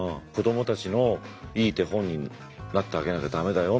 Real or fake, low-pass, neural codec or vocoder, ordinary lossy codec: real; none; none; none